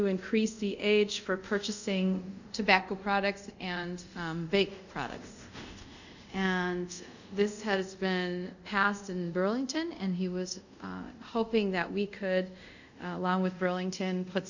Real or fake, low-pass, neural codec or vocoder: fake; 7.2 kHz; codec, 24 kHz, 0.5 kbps, DualCodec